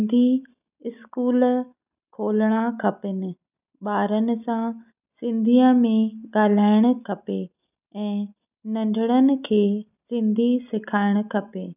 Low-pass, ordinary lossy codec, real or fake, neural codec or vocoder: 3.6 kHz; none; real; none